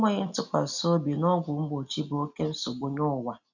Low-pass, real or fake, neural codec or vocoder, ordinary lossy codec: 7.2 kHz; real; none; none